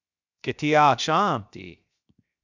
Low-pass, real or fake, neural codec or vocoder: 7.2 kHz; fake; codec, 16 kHz, 0.7 kbps, FocalCodec